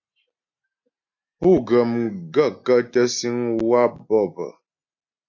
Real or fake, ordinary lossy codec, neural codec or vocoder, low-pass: real; AAC, 48 kbps; none; 7.2 kHz